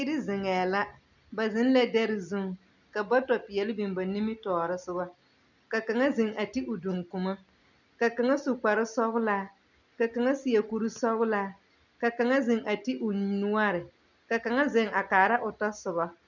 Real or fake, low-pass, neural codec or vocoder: real; 7.2 kHz; none